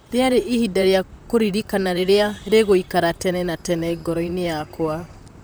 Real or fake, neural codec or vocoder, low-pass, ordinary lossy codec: fake; vocoder, 44.1 kHz, 128 mel bands, Pupu-Vocoder; none; none